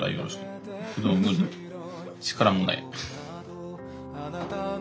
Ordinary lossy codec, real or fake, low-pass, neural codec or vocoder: none; real; none; none